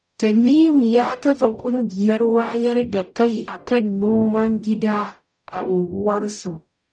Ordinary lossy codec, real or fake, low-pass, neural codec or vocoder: none; fake; 9.9 kHz; codec, 44.1 kHz, 0.9 kbps, DAC